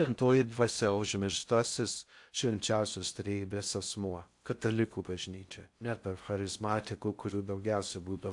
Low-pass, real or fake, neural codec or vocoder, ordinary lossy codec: 10.8 kHz; fake; codec, 16 kHz in and 24 kHz out, 0.6 kbps, FocalCodec, streaming, 4096 codes; AAC, 64 kbps